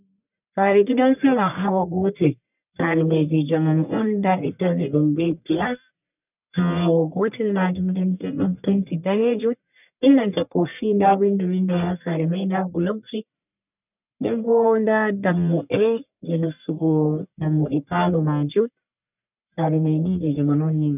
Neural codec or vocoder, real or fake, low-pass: codec, 44.1 kHz, 1.7 kbps, Pupu-Codec; fake; 3.6 kHz